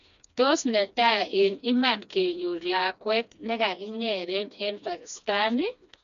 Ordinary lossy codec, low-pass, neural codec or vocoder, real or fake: none; 7.2 kHz; codec, 16 kHz, 2 kbps, FreqCodec, smaller model; fake